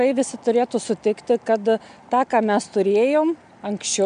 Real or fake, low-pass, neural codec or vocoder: real; 9.9 kHz; none